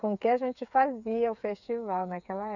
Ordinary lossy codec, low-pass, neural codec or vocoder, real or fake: none; 7.2 kHz; codec, 16 kHz, 8 kbps, FreqCodec, smaller model; fake